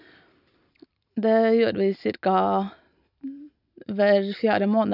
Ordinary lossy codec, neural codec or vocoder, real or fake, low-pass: none; none; real; 5.4 kHz